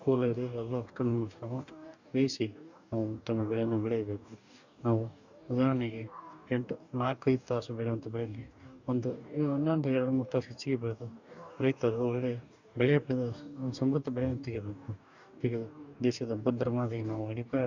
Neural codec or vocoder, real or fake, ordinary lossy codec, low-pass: codec, 44.1 kHz, 2.6 kbps, DAC; fake; none; 7.2 kHz